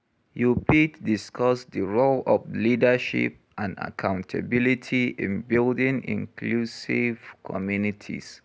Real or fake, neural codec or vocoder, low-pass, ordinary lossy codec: real; none; none; none